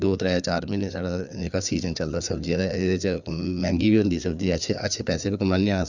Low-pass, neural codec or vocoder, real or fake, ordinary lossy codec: 7.2 kHz; codec, 16 kHz, 4 kbps, FreqCodec, larger model; fake; none